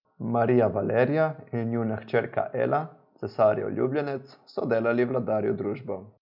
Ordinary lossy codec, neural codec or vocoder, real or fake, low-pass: none; none; real; 5.4 kHz